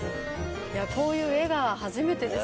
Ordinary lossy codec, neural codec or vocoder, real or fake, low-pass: none; none; real; none